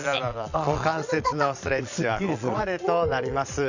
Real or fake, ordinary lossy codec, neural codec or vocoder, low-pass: fake; none; vocoder, 22.05 kHz, 80 mel bands, Vocos; 7.2 kHz